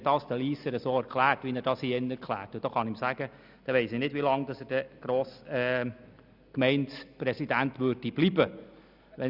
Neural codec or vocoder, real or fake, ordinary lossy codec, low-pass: none; real; none; 5.4 kHz